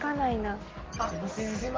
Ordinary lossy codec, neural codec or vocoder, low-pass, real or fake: Opus, 16 kbps; none; 7.2 kHz; real